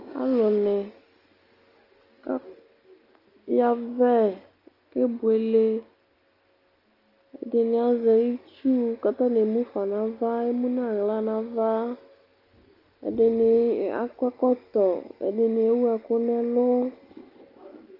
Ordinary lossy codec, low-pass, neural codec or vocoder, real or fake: Opus, 24 kbps; 5.4 kHz; none; real